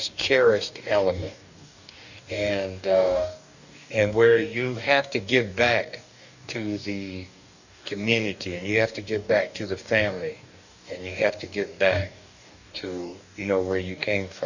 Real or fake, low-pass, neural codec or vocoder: fake; 7.2 kHz; codec, 44.1 kHz, 2.6 kbps, DAC